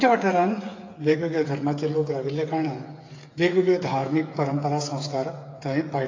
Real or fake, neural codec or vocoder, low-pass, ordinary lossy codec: fake; codec, 16 kHz, 8 kbps, FreqCodec, smaller model; 7.2 kHz; AAC, 32 kbps